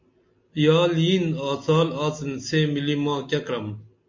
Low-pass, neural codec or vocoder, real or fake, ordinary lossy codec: 7.2 kHz; none; real; MP3, 48 kbps